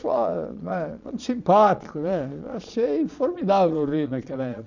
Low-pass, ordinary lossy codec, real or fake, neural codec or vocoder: 7.2 kHz; none; fake; vocoder, 22.05 kHz, 80 mel bands, Vocos